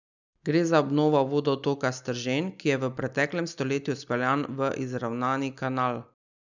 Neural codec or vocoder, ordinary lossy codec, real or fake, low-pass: none; none; real; 7.2 kHz